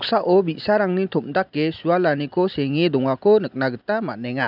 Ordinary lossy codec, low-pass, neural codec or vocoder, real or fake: none; 5.4 kHz; none; real